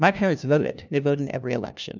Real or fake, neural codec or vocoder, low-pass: fake; codec, 16 kHz, 1 kbps, FunCodec, trained on LibriTTS, 50 frames a second; 7.2 kHz